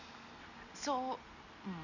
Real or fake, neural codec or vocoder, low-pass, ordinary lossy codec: real; none; 7.2 kHz; none